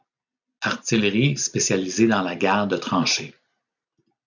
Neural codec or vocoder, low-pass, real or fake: none; 7.2 kHz; real